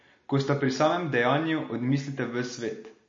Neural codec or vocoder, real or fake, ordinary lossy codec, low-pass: none; real; MP3, 32 kbps; 7.2 kHz